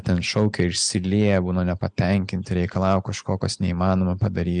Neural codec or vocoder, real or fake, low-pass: none; real; 9.9 kHz